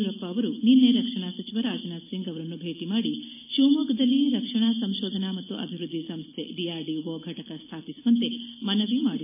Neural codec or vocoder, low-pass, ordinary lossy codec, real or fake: none; 3.6 kHz; MP3, 24 kbps; real